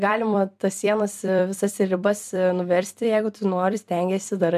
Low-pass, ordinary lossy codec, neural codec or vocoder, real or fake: 14.4 kHz; MP3, 96 kbps; vocoder, 44.1 kHz, 128 mel bands every 256 samples, BigVGAN v2; fake